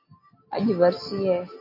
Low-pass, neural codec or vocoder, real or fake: 5.4 kHz; none; real